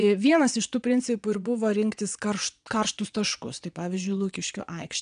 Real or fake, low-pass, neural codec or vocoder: fake; 9.9 kHz; vocoder, 22.05 kHz, 80 mel bands, Vocos